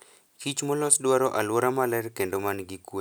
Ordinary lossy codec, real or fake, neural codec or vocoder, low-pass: none; real; none; none